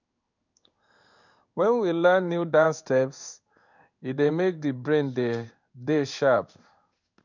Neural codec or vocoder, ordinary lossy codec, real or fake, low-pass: codec, 16 kHz in and 24 kHz out, 1 kbps, XY-Tokenizer; none; fake; 7.2 kHz